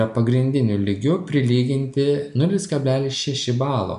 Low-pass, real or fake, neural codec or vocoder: 10.8 kHz; real; none